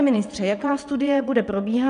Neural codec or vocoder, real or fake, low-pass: vocoder, 22.05 kHz, 80 mel bands, WaveNeXt; fake; 9.9 kHz